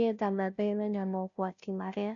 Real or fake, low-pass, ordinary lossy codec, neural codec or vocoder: fake; 7.2 kHz; none; codec, 16 kHz, 0.5 kbps, FunCodec, trained on Chinese and English, 25 frames a second